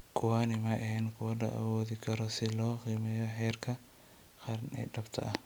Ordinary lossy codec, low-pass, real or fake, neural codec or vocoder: none; none; real; none